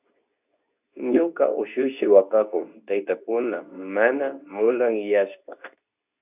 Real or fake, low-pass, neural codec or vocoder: fake; 3.6 kHz; codec, 24 kHz, 0.9 kbps, WavTokenizer, medium speech release version 2